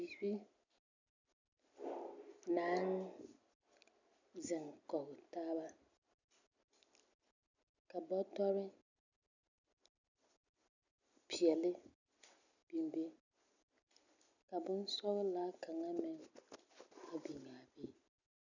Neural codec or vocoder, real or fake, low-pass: none; real; 7.2 kHz